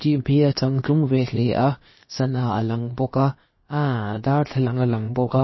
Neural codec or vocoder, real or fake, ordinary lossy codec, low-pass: codec, 16 kHz, about 1 kbps, DyCAST, with the encoder's durations; fake; MP3, 24 kbps; 7.2 kHz